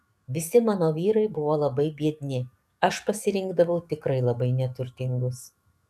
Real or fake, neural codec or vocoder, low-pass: fake; codec, 44.1 kHz, 7.8 kbps, DAC; 14.4 kHz